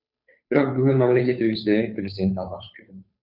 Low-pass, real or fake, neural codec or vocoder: 5.4 kHz; fake; codec, 16 kHz, 2 kbps, FunCodec, trained on Chinese and English, 25 frames a second